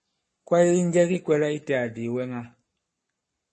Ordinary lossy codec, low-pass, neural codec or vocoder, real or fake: MP3, 32 kbps; 10.8 kHz; codec, 44.1 kHz, 7.8 kbps, Pupu-Codec; fake